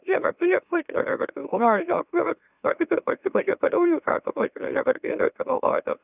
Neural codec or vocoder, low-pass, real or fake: autoencoder, 44.1 kHz, a latent of 192 numbers a frame, MeloTTS; 3.6 kHz; fake